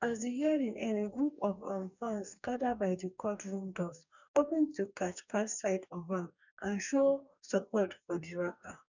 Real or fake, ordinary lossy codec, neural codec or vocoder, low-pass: fake; none; codec, 44.1 kHz, 2.6 kbps, DAC; 7.2 kHz